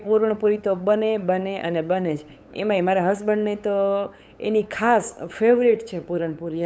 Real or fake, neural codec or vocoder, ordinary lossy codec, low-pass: fake; codec, 16 kHz, 8 kbps, FunCodec, trained on LibriTTS, 25 frames a second; none; none